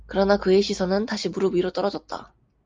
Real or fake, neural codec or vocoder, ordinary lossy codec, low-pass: real; none; Opus, 24 kbps; 7.2 kHz